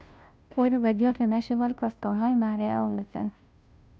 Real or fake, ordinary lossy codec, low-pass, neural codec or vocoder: fake; none; none; codec, 16 kHz, 0.5 kbps, FunCodec, trained on Chinese and English, 25 frames a second